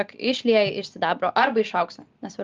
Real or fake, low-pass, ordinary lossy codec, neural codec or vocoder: real; 7.2 kHz; Opus, 32 kbps; none